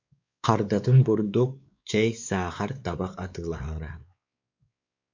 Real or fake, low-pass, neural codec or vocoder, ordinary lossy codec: fake; 7.2 kHz; codec, 16 kHz, 4 kbps, X-Codec, WavLM features, trained on Multilingual LibriSpeech; MP3, 64 kbps